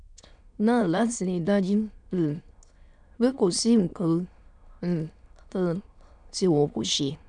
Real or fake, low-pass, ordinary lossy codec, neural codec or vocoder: fake; 9.9 kHz; none; autoencoder, 22.05 kHz, a latent of 192 numbers a frame, VITS, trained on many speakers